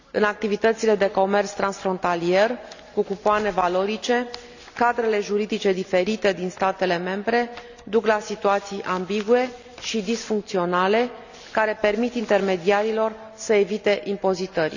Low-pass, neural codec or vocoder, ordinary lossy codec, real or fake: 7.2 kHz; none; none; real